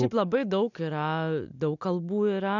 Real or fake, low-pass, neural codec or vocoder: real; 7.2 kHz; none